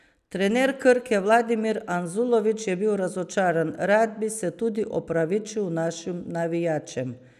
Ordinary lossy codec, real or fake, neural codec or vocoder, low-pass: none; real; none; 14.4 kHz